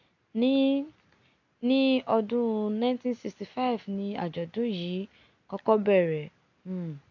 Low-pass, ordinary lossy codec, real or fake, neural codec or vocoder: 7.2 kHz; AAC, 48 kbps; real; none